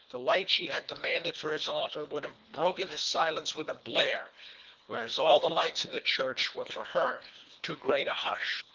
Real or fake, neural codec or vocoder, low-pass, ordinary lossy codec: fake; codec, 24 kHz, 1.5 kbps, HILCodec; 7.2 kHz; Opus, 32 kbps